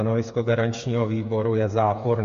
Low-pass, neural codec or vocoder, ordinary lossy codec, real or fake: 7.2 kHz; codec, 16 kHz, 8 kbps, FreqCodec, smaller model; MP3, 48 kbps; fake